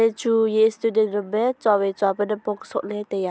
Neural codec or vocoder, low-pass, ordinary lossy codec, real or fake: none; none; none; real